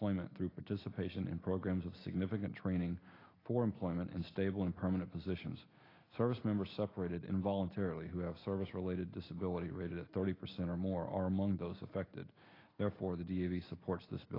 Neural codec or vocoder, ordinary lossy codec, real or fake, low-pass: none; AAC, 24 kbps; real; 5.4 kHz